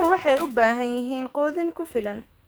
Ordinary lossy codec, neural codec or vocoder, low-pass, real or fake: none; codec, 44.1 kHz, 2.6 kbps, SNAC; none; fake